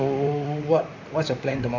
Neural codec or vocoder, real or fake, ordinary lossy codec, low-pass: vocoder, 44.1 kHz, 128 mel bands, Pupu-Vocoder; fake; none; 7.2 kHz